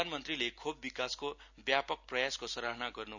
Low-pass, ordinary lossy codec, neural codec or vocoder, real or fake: 7.2 kHz; none; none; real